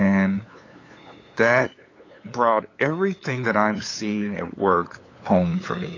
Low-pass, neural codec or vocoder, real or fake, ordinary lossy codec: 7.2 kHz; codec, 16 kHz, 8 kbps, FunCodec, trained on LibriTTS, 25 frames a second; fake; AAC, 32 kbps